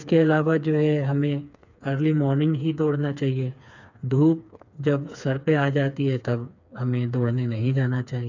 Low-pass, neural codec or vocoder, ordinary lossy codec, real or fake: 7.2 kHz; codec, 16 kHz, 4 kbps, FreqCodec, smaller model; none; fake